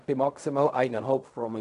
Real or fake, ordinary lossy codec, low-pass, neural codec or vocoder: fake; none; 10.8 kHz; codec, 16 kHz in and 24 kHz out, 0.4 kbps, LongCat-Audio-Codec, fine tuned four codebook decoder